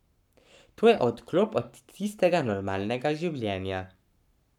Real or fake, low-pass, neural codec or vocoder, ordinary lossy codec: fake; 19.8 kHz; codec, 44.1 kHz, 7.8 kbps, Pupu-Codec; none